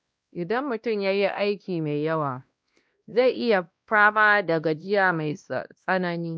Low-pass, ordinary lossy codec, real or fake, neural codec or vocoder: none; none; fake; codec, 16 kHz, 1 kbps, X-Codec, WavLM features, trained on Multilingual LibriSpeech